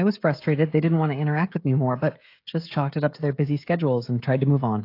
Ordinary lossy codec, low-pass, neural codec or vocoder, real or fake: AAC, 32 kbps; 5.4 kHz; codec, 16 kHz, 16 kbps, FreqCodec, smaller model; fake